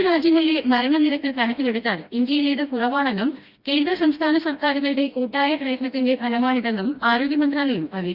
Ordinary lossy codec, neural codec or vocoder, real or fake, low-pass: Opus, 64 kbps; codec, 16 kHz, 1 kbps, FreqCodec, smaller model; fake; 5.4 kHz